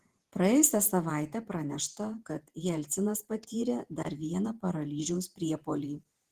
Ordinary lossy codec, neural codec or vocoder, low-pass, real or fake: Opus, 16 kbps; vocoder, 48 kHz, 128 mel bands, Vocos; 14.4 kHz; fake